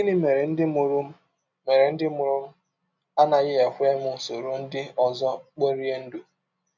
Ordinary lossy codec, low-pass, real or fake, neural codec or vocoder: none; 7.2 kHz; real; none